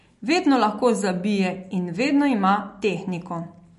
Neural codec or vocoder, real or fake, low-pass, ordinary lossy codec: none; real; 14.4 kHz; MP3, 48 kbps